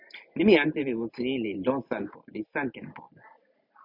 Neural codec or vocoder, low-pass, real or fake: none; 5.4 kHz; real